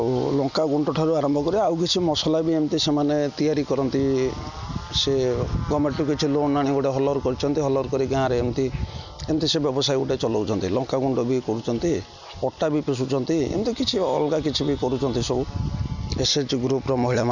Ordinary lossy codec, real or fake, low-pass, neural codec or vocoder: none; real; 7.2 kHz; none